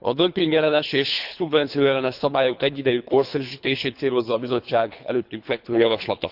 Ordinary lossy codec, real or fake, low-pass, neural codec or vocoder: none; fake; 5.4 kHz; codec, 24 kHz, 3 kbps, HILCodec